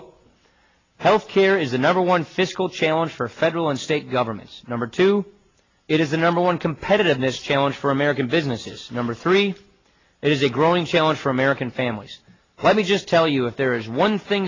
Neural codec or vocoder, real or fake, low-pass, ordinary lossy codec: none; real; 7.2 kHz; AAC, 32 kbps